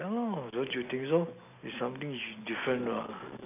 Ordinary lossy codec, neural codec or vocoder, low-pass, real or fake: none; none; 3.6 kHz; real